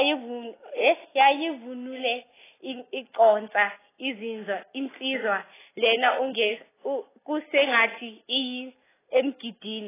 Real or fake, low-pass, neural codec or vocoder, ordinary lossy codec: real; 3.6 kHz; none; AAC, 16 kbps